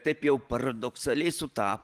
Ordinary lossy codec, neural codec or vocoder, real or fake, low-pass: Opus, 24 kbps; vocoder, 44.1 kHz, 128 mel bands every 512 samples, BigVGAN v2; fake; 14.4 kHz